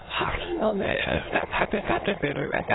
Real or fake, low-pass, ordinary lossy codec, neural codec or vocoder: fake; 7.2 kHz; AAC, 16 kbps; autoencoder, 22.05 kHz, a latent of 192 numbers a frame, VITS, trained on many speakers